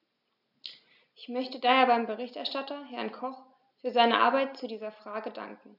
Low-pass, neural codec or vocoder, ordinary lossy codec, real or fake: 5.4 kHz; none; none; real